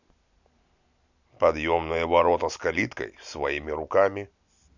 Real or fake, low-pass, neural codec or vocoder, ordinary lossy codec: real; 7.2 kHz; none; none